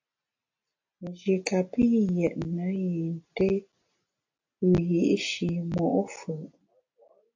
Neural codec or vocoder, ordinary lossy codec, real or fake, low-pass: none; AAC, 48 kbps; real; 7.2 kHz